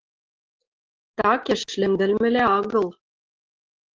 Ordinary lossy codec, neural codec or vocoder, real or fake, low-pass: Opus, 16 kbps; none; real; 7.2 kHz